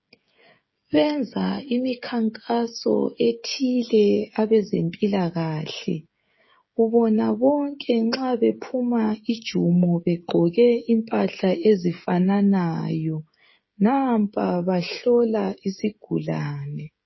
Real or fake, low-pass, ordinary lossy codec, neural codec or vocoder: fake; 7.2 kHz; MP3, 24 kbps; codec, 16 kHz, 16 kbps, FreqCodec, smaller model